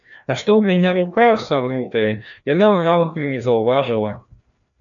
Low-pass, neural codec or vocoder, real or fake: 7.2 kHz; codec, 16 kHz, 1 kbps, FreqCodec, larger model; fake